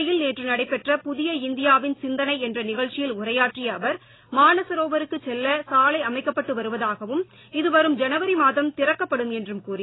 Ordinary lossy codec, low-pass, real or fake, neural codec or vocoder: AAC, 16 kbps; 7.2 kHz; real; none